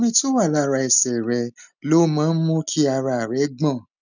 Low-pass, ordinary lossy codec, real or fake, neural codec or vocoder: 7.2 kHz; none; real; none